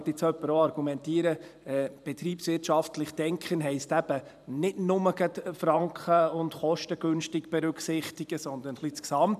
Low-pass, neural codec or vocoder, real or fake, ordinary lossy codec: 14.4 kHz; vocoder, 44.1 kHz, 128 mel bands every 512 samples, BigVGAN v2; fake; none